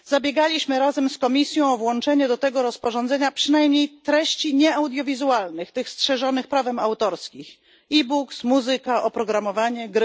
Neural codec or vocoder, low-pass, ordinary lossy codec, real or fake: none; none; none; real